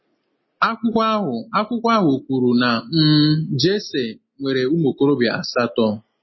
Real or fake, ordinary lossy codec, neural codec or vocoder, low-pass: real; MP3, 24 kbps; none; 7.2 kHz